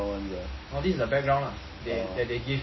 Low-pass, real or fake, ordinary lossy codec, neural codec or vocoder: 7.2 kHz; real; MP3, 24 kbps; none